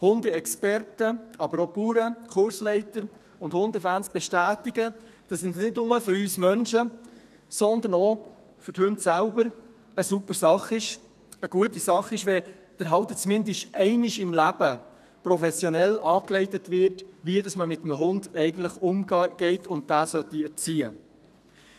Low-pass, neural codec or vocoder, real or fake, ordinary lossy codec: 14.4 kHz; codec, 32 kHz, 1.9 kbps, SNAC; fake; none